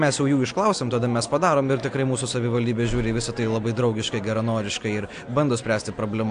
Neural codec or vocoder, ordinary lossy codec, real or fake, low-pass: none; AAC, 64 kbps; real; 9.9 kHz